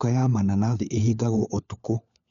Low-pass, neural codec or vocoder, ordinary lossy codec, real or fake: 7.2 kHz; codec, 16 kHz, 4 kbps, FunCodec, trained on LibriTTS, 50 frames a second; none; fake